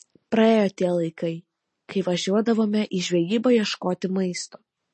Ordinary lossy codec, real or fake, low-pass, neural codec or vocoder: MP3, 32 kbps; real; 10.8 kHz; none